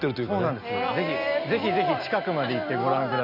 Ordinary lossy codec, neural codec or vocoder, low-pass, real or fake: none; none; 5.4 kHz; real